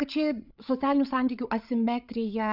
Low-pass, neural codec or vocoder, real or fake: 5.4 kHz; codec, 16 kHz, 8 kbps, FreqCodec, larger model; fake